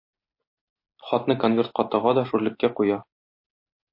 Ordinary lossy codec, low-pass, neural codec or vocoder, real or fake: MP3, 32 kbps; 5.4 kHz; none; real